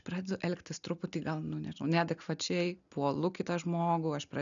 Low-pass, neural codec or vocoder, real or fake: 7.2 kHz; none; real